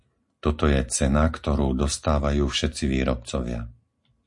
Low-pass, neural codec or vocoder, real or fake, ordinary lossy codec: 10.8 kHz; none; real; MP3, 48 kbps